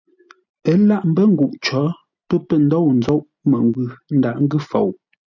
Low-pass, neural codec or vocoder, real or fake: 7.2 kHz; none; real